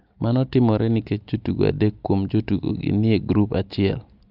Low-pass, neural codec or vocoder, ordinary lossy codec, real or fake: 5.4 kHz; none; Opus, 24 kbps; real